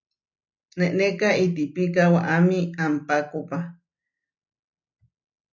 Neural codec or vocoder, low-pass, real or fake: none; 7.2 kHz; real